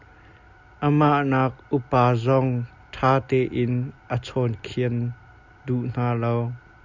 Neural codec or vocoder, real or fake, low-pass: none; real; 7.2 kHz